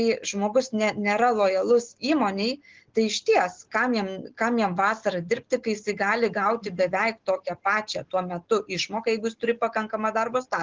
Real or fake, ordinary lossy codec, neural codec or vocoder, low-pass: real; Opus, 16 kbps; none; 7.2 kHz